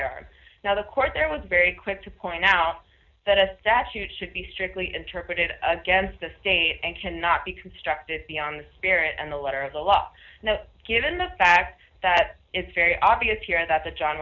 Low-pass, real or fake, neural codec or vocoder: 7.2 kHz; real; none